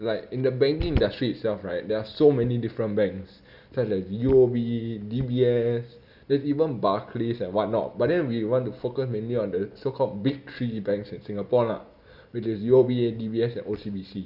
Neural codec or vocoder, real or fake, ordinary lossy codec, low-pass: none; real; none; 5.4 kHz